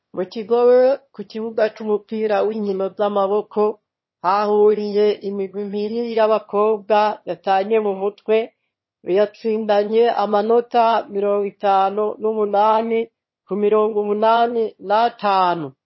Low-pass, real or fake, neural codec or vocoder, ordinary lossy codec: 7.2 kHz; fake; autoencoder, 22.05 kHz, a latent of 192 numbers a frame, VITS, trained on one speaker; MP3, 24 kbps